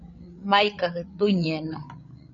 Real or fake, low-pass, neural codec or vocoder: fake; 7.2 kHz; codec, 16 kHz, 8 kbps, FreqCodec, larger model